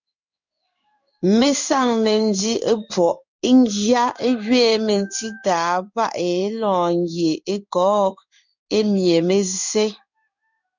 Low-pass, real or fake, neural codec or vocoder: 7.2 kHz; fake; codec, 16 kHz in and 24 kHz out, 1 kbps, XY-Tokenizer